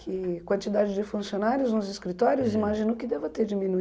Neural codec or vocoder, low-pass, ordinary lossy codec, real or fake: none; none; none; real